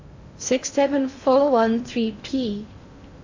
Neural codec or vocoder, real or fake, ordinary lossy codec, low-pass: codec, 16 kHz in and 24 kHz out, 0.6 kbps, FocalCodec, streaming, 2048 codes; fake; AAC, 48 kbps; 7.2 kHz